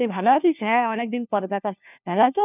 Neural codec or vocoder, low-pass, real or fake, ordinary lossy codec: codec, 16 kHz, 1 kbps, FunCodec, trained on LibriTTS, 50 frames a second; 3.6 kHz; fake; none